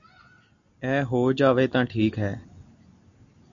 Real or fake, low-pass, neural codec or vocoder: real; 7.2 kHz; none